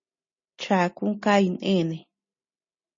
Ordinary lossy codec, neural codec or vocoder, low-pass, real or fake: MP3, 32 kbps; none; 7.2 kHz; real